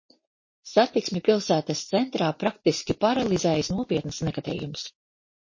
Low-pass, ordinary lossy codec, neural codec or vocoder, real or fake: 7.2 kHz; MP3, 32 kbps; none; real